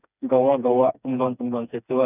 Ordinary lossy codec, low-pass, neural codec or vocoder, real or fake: none; 3.6 kHz; codec, 16 kHz, 2 kbps, FreqCodec, smaller model; fake